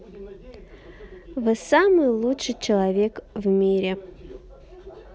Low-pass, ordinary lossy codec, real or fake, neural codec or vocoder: none; none; real; none